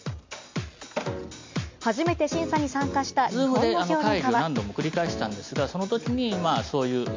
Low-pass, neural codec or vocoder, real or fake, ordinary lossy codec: 7.2 kHz; none; real; MP3, 48 kbps